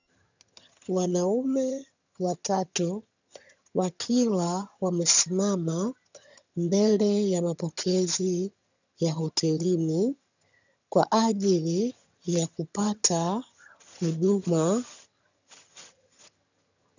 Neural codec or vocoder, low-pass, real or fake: vocoder, 22.05 kHz, 80 mel bands, HiFi-GAN; 7.2 kHz; fake